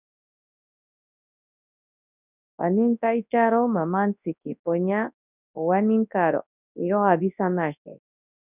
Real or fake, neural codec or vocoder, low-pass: fake; codec, 24 kHz, 0.9 kbps, WavTokenizer, large speech release; 3.6 kHz